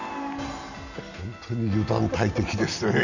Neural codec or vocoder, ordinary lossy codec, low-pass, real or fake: none; none; 7.2 kHz; real